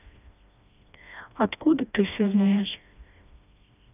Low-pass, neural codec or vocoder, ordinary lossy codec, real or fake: 3.6 kHz; codec, 16 kHz, 1 kbps, FreqCodec, smaller model; none; fake